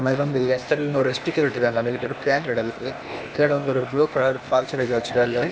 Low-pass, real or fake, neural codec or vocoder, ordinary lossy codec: none; fake; codec, 16 kHz, 0.8 kbps, ZipCodec; none